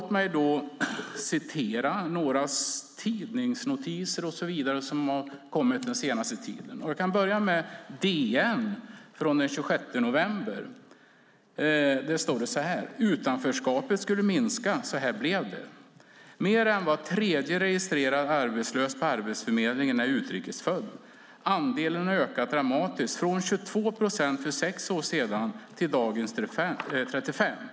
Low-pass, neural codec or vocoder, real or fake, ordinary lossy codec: none; none; real; none